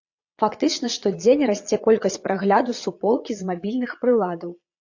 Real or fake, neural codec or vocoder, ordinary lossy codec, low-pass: fake; vocoder, 22.05 kHz, 80 mel bands, Vocos; AAC, 48 kbps; 7.2 kHz